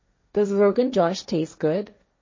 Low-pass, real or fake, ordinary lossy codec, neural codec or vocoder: 7.2 kHz; fake; MP3, 32 kbps; codec, 16 kHz, 1.1 kbps, Voila-Tokenizer